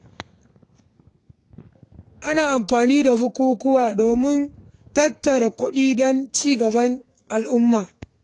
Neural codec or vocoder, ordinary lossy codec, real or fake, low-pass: codec, 32 kHz, 1.9 kbps, SNAC; AAC, 48 kbps; fake; 10.8 kHz